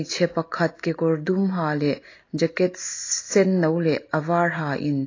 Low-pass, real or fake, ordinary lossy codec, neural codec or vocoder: 7.2 kHz; real; AAC, 32 kbps; none